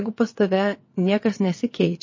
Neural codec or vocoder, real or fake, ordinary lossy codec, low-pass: vocoder, 22.05 kHz, 80 mel bands, WaveNeXt; fake; MP3, 32 kbps; 7.2 kHz